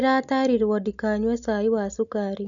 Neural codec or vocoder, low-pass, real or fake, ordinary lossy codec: none; 7.2 kHz; real; none